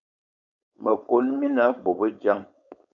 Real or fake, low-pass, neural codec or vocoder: fake; 7.2 kHz; codec, 16 kHz, 4.8 kbps, FACodec